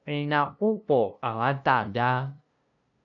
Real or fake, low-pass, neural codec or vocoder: fake; 7.2 kHz; codec, 16 kHz, 0.5 kbps, FunCodec, trained on LibriTTS, 25 frames a second